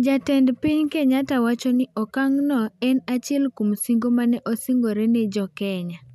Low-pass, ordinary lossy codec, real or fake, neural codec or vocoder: 14.4 kHz; none; real; none